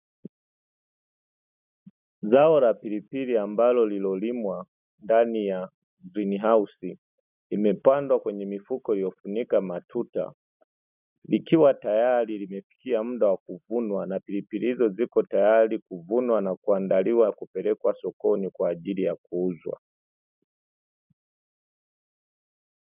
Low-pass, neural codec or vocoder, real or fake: 3.6 kHz; none; real